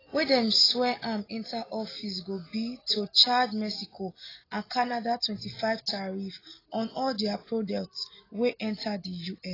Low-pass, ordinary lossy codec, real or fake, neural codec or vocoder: 5.4 kHz; AAC, 24 kbps; real; none